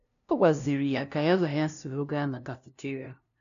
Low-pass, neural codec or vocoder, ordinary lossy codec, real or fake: 7.2 kHz; codec, 16 kHz, 0.5 kbps, FunCodec, trained on LibriTTS, 25 frames a second; none; fake